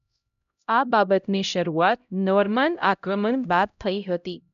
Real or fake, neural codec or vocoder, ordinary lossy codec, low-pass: fake; codec, 16 kHz, 0.5 kbps, X-Codec, HuBERT features, trained on LibriSpeech; none; 7.2 kHz